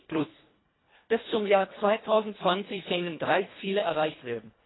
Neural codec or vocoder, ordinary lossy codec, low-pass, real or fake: codec, 24 kHz, 1.5 kbps, HILCodec; AAC, 16 kbps; 7.2 kHz; fake